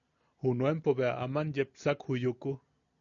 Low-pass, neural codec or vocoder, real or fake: 7.2 kHz; none; real